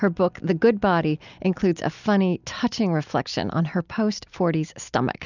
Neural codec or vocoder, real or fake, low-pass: none; real; 7.2 kHz